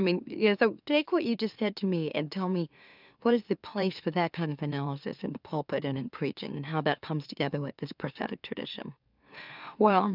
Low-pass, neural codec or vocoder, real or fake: 5.4 kHz; autoencoder, 44.1 kHz, a latent of 192 numbers a frame, MeloTTS; fake